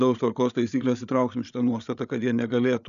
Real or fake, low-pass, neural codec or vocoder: fake; 7.2 kHz; codec, 16 kHz, 8 kbps, FreqCodec, larger model